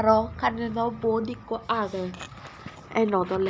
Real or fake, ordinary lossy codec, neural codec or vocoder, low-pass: real; none; none; none